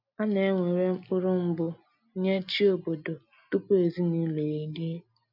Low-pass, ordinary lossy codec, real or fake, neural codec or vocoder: 5.4 kHz; none; real; none